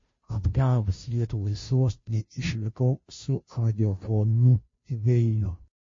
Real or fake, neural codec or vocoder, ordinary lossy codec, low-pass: fake; codec, 16 kHz, 0.5 kbps, FunCodec, trained on Chinese and English, 25 frames a second; MP3, 32 kbps; 7.2 kHz